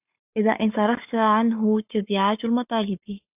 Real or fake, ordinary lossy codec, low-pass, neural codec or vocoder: fake; AAC, 32 kbps; 3.6 kHz; codec, 44.1 kHz, 7.8 kbps, Pupu-Codec